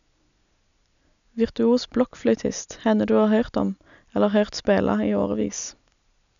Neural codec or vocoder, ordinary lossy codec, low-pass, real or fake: none; none; 7.2 kHz; real